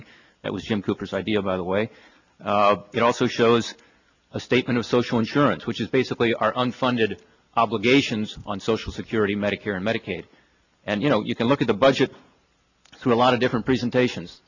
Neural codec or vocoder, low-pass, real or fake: none; 7.2 kHz; real